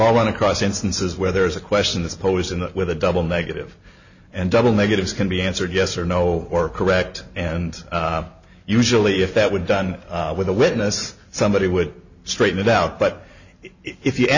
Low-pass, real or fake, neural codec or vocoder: 7.2 kHz; real; none